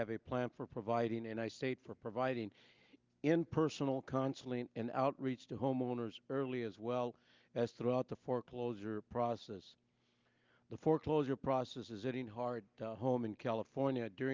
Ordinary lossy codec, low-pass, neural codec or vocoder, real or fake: Opus, 32 kbps; 7.2 kHz; none; real